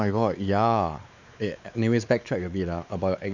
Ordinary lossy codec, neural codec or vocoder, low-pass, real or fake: none; codec, 16 kHz, 4 kbps, X-Codec, WavLM features, trained on Multilingual LibriSpeech; 7.2 kHz; fake